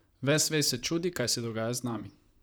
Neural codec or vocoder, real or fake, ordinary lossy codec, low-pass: vocoder, 44.1 kHz, 128 mel bands, Pupu-Vocoder; fake; none; none